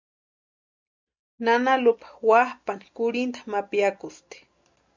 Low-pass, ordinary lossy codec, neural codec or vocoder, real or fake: 7.2 kHz; MP3, 64 kbps; none; real